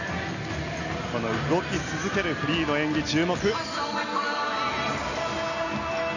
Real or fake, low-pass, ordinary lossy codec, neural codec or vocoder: real; 7.2 kHz; none; none